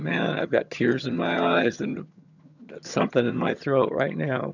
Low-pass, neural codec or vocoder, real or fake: 7.2 kHz; vocoder, 22.05 kHz, 80 mel bands, HiFi-GAN; fake